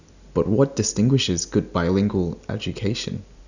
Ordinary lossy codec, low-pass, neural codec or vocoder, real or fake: none; 7.2 kHz; none; real